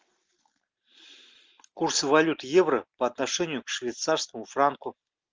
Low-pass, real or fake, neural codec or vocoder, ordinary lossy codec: 7.2 kHz; real; none; Opus, 24 kbps